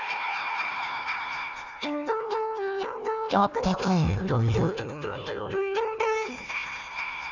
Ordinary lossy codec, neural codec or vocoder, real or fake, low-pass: none; codec, 16 kHz, 1 kbps, FunCodec, trained on Chinese and English, 50 frames a second; fake; 7.2 kHz